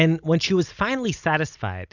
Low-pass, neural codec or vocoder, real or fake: 7.2 kHz; none; real